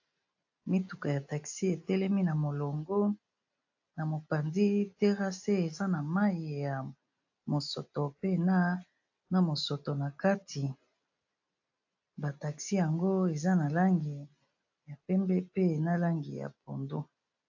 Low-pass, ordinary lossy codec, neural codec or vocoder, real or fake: 7.2 kHz; AAC, 48 kbps; none; real